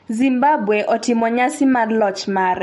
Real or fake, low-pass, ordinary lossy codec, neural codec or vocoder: real; 19.8 kHz; MP3, 48 kbps; none